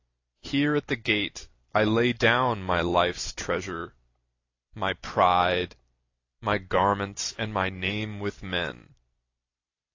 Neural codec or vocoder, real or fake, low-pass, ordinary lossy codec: vocoder, 44.1 kHz, 128 mel bands every 256 samples, BigVGAN v2; fake; 7.2 kHz; AAC, 48 kbps